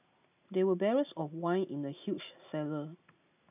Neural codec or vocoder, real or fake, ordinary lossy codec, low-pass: none; real; none; 3.6 kHz